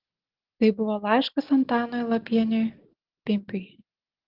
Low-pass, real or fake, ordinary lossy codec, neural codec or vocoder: 5.4 kHz; real; Opus, 16 kbps; none